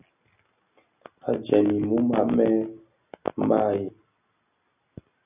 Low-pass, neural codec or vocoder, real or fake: 3.6 kHz; none; real